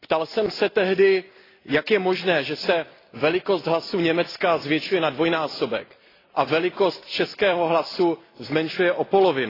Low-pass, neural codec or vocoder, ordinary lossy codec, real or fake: 5.4 kHz; none; AAC, 24 kbps; real